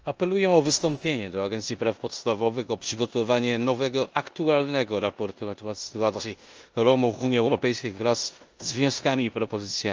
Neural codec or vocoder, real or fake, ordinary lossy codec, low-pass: codec, 16 kHz in and 24 kHz out, 0.9 kbps, LongCat-Audio-Codec, four codebook decoder; fake; Opus, 24 kbps; 7.2 kHz